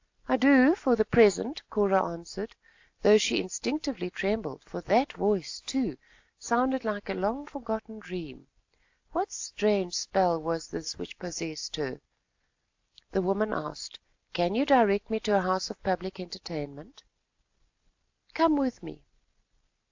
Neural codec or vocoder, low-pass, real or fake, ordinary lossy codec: none; 7.2 kHz; real; AAC, 48 kbps